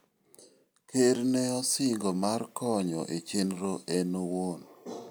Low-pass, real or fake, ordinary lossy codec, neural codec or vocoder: none; real; none; none